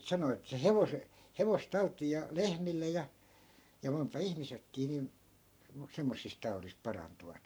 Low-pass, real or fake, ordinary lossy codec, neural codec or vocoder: none; fake; none; codec, 44.1 kHz, 7.8 kbps, Pupu-Codec